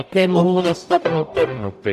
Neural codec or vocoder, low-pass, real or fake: codec, 44.1 kHz, 0.9 kbps, DAC; 14.4 kHz; fake